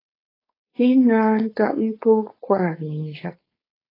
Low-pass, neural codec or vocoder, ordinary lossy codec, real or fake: 5.4 kHz; codec, 16 kHz, 4.8 kbps, FACodec; AAC, 24 kbps; fake